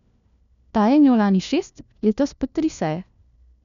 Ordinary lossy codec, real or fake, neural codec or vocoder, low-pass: none; fake; codec, 16 kHz, 1 kbps, FunCodec, trained on LibriTTS, 50 frames a second; 7.2 kHz